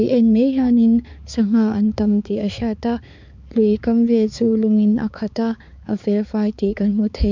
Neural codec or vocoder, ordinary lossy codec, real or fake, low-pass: codec, 16 kHz, 4 kbps, X-Codec, HuBERT features, trained on balanced general audio; MP3, 64 kbps; fake; 7.2 kHz